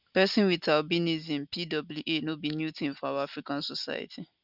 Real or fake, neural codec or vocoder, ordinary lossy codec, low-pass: real; none; none; 5.4 kHz